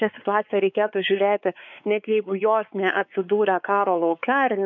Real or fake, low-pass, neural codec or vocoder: fake; 7.2 kHz; codec, 16 kHz, 4 kbps, X-Codec, WavLM features, trained on Multilingual LibriSpeech